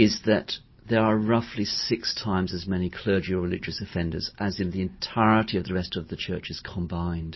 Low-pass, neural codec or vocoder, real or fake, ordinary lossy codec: 7.2 kHz; none; real; MP3, 24 kbps